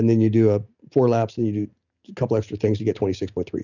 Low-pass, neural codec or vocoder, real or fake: 7.2 kHz; none; real